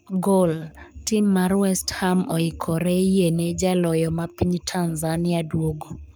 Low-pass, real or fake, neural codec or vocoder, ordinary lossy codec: none; fake; codec, 44.1 kHz, 7.8 kbps, Pupu-Codec; none